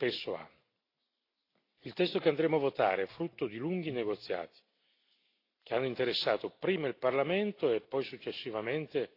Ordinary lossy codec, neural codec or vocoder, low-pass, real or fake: AAC, 32 kbps; none; 5.4 kHz; real